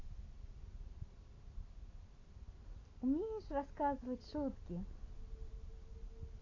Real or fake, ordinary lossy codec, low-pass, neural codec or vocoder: real; AAC, 32 kbps; 7.2 kHz; none